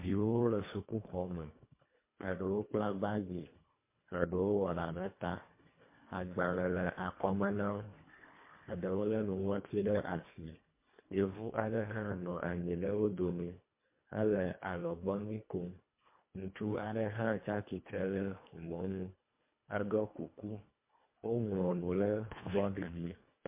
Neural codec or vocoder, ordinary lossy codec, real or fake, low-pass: codec, 24 kHz, 1.5 kbps, HILCodec; MP3, 24 kbps; fake; 3.6 kHz